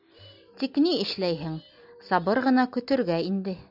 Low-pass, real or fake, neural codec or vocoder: 5.4 kHz; real; none